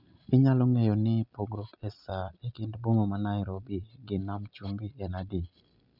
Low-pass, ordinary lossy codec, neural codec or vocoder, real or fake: 5.4 kHz; none; none; real